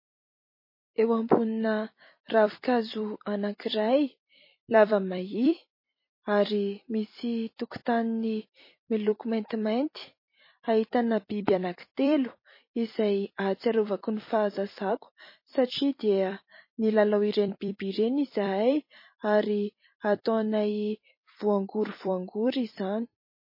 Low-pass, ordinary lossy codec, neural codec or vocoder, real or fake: 5.4 kHz; MP3, 24 kbps; vocoder, 44.1 kHz, 128 mel bands every 256 samples, BigVGAN v2; fake